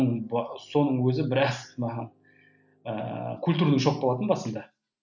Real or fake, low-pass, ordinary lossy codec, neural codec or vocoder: real; 7.2 kHz; none; none